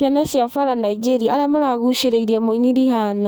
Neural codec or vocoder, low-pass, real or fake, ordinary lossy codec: codec, 44.1 kHz, 2.6 kbps, SNAC; none; fake; none